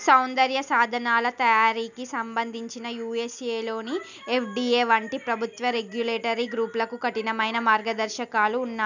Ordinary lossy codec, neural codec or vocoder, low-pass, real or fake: none; none; 7.2 kHz; real